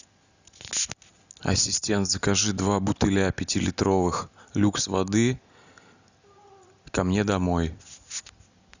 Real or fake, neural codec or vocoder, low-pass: real; none; 7.2 kHz